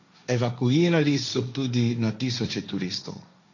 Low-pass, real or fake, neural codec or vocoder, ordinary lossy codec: 7.2 kHz; fake; codec, 16 kHz, 1.1 kbps, Voila-Tokenizer; none